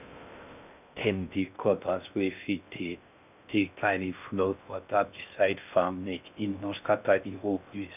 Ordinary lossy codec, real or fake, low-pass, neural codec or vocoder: none; fake; 3.6 kHz; codec, 16 kHz in and 24 kHz out, 0.6 kbps, FocalCodec, streaming, 2048 codes